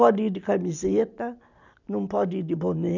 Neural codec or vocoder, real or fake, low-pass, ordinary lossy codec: none; real; 7.2 kHz; none